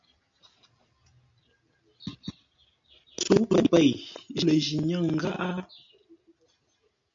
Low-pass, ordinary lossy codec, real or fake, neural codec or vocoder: 7.2 kHz; MP3, 48 kbps; real; none